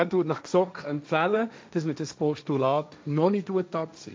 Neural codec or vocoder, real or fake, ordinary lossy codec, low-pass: codec, 16 kHz, 1.1 kbps, Voila-Tokenizer; fake; none; none